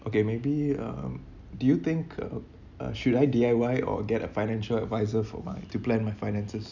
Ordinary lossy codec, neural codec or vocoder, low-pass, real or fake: none; none; 7.2 kHz; real